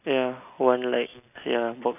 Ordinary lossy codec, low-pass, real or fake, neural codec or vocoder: none; 3.6 kHz; real; none